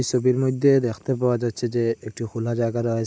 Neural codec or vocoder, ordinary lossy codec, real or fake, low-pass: none; none; real; none